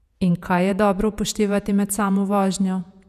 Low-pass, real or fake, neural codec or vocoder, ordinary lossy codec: 14.4 kHz; fake; vocoder, 48 kHz, 128 mel bands, Vocos; none